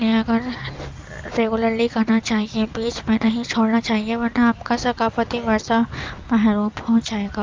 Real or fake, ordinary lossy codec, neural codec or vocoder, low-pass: real; Opus, 32 kbps; none; 7.2 kHz